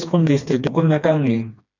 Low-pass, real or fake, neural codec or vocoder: 7.2 kHz; fake; codec, 16 kHz, 2 kbps, FreqCodec, smaller model